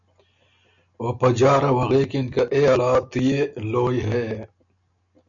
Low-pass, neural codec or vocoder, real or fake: 7.2 kHz; none; real